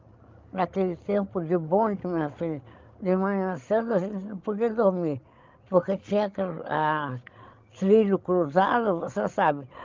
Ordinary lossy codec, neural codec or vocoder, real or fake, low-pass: Opus, 32 kbps; codec, 16 kHz, 16 kbps, FreqCodec, larger model; fake; 7.2 kHz